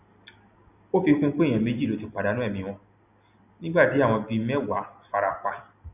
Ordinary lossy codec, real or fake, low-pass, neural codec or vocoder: none; real; 3.6 kHz; none